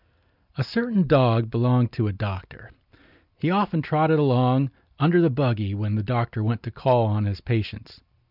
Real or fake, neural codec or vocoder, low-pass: real; none; 5.4 kHz